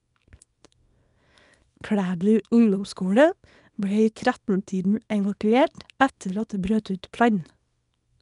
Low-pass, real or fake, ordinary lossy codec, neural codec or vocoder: 10.8 kHz; fake; none; codec, 24 kHz, 0.9 kbps, WavTokenizer, small release